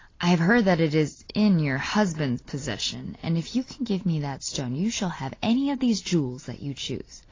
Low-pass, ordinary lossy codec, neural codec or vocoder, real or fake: 7.2 kHz; AAC, 32 kbps; none; real